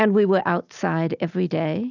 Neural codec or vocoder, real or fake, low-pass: none; real; 7.2 kHz